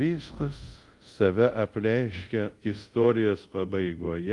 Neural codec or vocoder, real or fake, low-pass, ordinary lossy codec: codec, 24 kHz, 0.5 kbps, DualCodec; fake; 10.8 kHz; Opus, 32 kbps